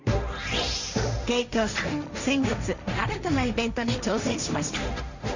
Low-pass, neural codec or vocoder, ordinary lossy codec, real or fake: 7.2 kHz; codec, 16 kHz, 1.1 kbps, Voila-Tokenizer; none; fake